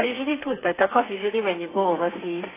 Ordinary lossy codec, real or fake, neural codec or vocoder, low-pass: AAC, 16 kbps; fake; codec, 32 kHz, 1.9 kbps, SNAC; 3.6 kHz